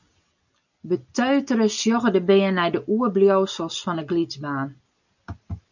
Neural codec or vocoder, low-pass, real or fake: none; 7.2 kHz; real